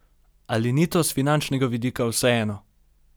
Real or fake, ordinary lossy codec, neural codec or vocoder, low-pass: real; none; none; none